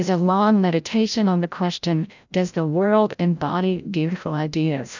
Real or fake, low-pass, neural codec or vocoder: fake; 7.2 kHz; codec, 16 kHz, 0.5 kbps, FreqCodec, larger model